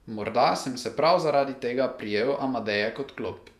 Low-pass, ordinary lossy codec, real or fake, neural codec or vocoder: 14.4 kHz; none; fake; vocoder, 48 kHz, 128 mel bands, Vocos